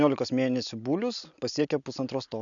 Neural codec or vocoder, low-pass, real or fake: none; 7.2 kHz; real